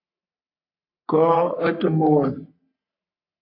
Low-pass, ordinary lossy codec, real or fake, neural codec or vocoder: 5.4 kHz; AAC, 32 kbps; fake; codec, 44.1 kHz, 3.4 kbps, Pupu-Codec